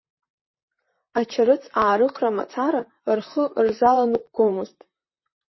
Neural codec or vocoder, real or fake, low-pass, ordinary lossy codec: vocoder, 44.1 kHz, 128 mel bands, Pupu-Vocoder; fake; 7.2 kHz; MP3, 24 kbps